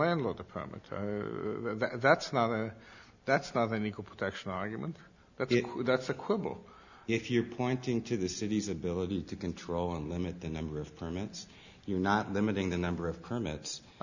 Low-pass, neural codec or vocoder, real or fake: 7.2 kHz; none; real